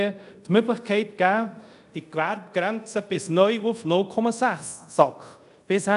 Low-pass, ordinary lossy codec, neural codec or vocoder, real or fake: 10.8 kHz; none; codec, 24 kHz, 0.5 kbps, DualCodec; fake